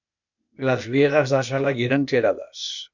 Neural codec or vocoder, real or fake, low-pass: codec, 16 kHz, 0.8 kbps, ZipCodec; fake; 7.2 kHz